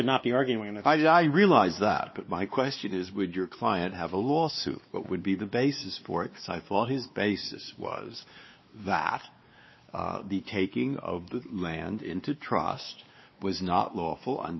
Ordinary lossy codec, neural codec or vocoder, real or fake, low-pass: MP3, 24 kbps; codec, 16 kHz, 2 kbps, X-Codec, WavLM features, trained on Multilingual LibriSpeech; fake; 7.2 kHz